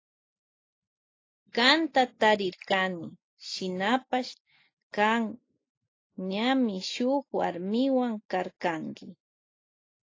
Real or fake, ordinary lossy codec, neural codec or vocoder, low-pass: real; AAC, 32 kbps; none; 7.2 kHz